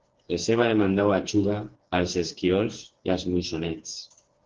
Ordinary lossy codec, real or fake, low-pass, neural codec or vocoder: Opus, 16 kbps; fake; 7.2 kHz; codec, 16 kHz, 4 kbps, FreqCodec, smaller model